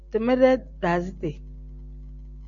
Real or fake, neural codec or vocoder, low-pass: real; none; 7.2 kHz